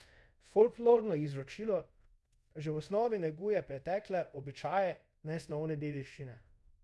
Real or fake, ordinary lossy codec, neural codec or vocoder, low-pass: fake; none; codec, 24 kHz, 0.5 kbps, DualCodec; none